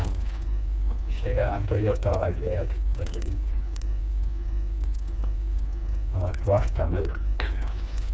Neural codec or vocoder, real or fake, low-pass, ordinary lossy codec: codec, 16 kHz, 2 kbps, FreqCodec, smaller model; fake; none; none